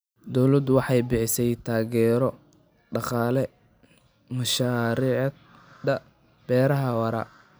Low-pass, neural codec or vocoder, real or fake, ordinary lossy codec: none; none; real; none